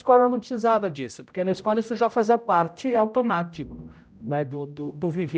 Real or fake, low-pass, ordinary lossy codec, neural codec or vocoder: fake; none; none; codec, 16 kHz, 0.5 kbps, X-Codec, HuBERT features, trained on general audio